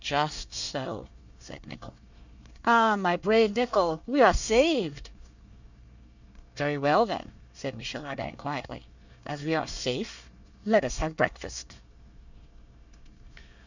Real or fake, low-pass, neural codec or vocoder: fake; 7.2 kHz; codec, 24 kHz, 1 kbps, SNAC